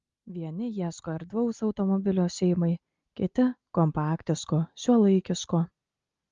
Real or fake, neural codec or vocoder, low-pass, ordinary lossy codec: real; none; 7.2 kHz; Opus, 32 kbps